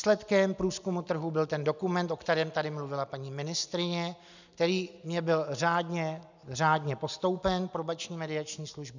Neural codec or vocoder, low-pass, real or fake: none; 7.2 kHz; real